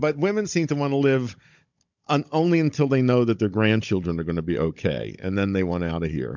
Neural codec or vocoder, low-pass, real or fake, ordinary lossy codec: codec, 16 kHz, 16 kbps, FunCodec, trained on Chinese and English, 50 frames a second; 7.2 kHz; fake; MP3, 64 kbps